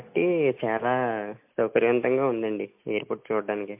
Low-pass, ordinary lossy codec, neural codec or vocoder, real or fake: 3.6 kHz; MP3, 32 kbps; none; real